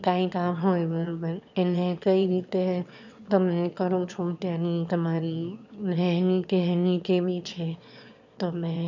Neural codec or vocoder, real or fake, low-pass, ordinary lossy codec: autoencoder, 22.05 kHz, a latent of 192 numbers a frame, VITS, trained on one speaker; fake; 7.2 kHz; none